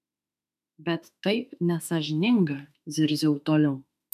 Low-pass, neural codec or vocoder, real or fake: 14.4 kHz; autoencoder, 48 kHz, 32 numbers a frame, DAC-VAE, trained on Japanese speech; fake